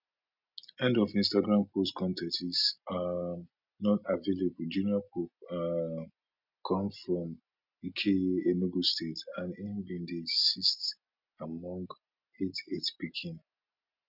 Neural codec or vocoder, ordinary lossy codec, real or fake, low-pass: none; none; real; 5.4 kHz